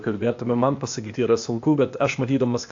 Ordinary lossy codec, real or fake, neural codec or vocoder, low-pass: AAC, 64 kbps; fake; codec, 16 kHz, 0.8 kbps, ZipCodec; 7.2 kHz